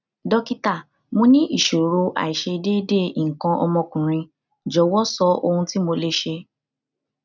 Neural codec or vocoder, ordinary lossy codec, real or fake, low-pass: none; none; real; 7.2 kHz